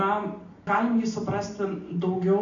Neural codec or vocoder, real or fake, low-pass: none; real; 7.2 kHz